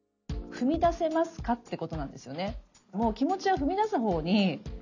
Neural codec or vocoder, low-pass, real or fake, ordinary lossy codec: none; 7.2 kHz; real; none